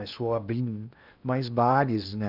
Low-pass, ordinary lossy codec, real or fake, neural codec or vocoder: 5.4 kHz; none; fake; codec, 16 kHz in and 24 kHz out, 0.8 kbps, FocalCodec, streaming, 65536 codes